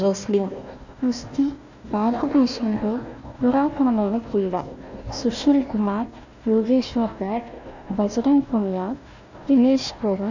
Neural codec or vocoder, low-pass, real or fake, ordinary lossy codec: codec, 16 kHz, 1 kbps, FunCodec, trained on Chinese and English, 50 frames a second; 7.2 kHz; fake; none